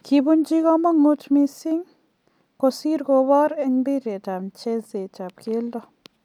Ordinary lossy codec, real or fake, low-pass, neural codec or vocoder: none; real; 19.8 kHz; none